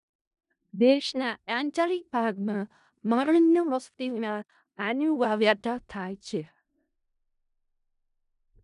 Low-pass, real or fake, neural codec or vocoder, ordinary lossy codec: 10.8 kHz; fake; codec, 16 kHz in and 24 kHz out, 0.4 kbps, LongCat-Audio-Codec, four codebook decoder; none